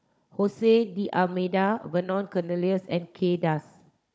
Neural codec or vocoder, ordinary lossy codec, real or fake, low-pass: codec, 16 kHz, 16 kbps, FunCodec, trained on Chinese and English, 50 frames a second; none; fake; none